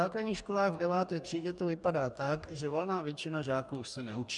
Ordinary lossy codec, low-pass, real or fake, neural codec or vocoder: MP3, 96 kbps; 10.8 kHz; fake; codec, 44.1 kHz, 2.6 kbps, DAC